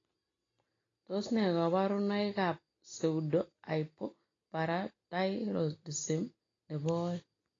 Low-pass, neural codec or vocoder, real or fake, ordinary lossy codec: 7.2 kHz; none; real; AAC, 32 kbps